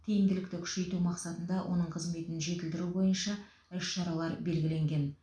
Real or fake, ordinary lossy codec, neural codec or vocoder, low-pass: real; none; none; none